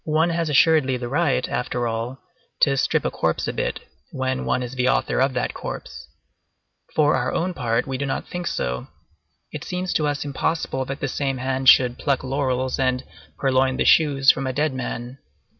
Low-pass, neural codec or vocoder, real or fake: 7.2 kHz; none; real